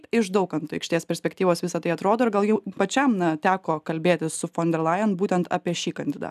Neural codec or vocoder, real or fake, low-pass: none; real; 14.4 kHz